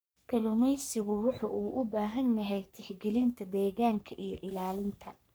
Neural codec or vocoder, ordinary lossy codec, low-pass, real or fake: codec, 44.1 kHz, 3.4 kbps, Pupu-Codec; none; none; fake